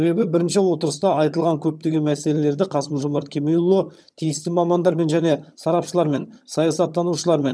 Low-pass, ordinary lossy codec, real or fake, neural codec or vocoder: none; none; fake; vocoder, 22.05 kHz, 80 mel bands, HiFi-GAN